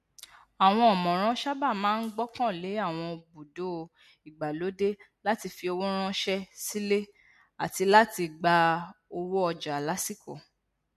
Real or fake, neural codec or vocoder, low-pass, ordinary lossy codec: real; none; 14.4 kHz; MP3, 64 kbps